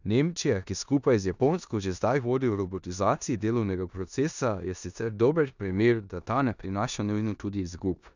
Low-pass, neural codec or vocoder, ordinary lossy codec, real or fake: 7.2 kHz; codec, 16 kHz in and 24 kHz out, 0.9 kbps, LongCat-Audio-Codec, four codebook decoder; none; fake